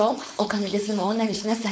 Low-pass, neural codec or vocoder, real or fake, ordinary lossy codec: none; codec, 16 kHz, 4.8 kbps, FACodec; fake; none